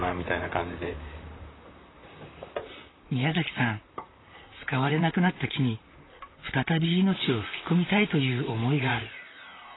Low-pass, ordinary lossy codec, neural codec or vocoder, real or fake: 7.2 kHz; AAC, 16 kbps; vocoder, 44.1 kHz, 128 mel bands, Pupu-Vocoder; fake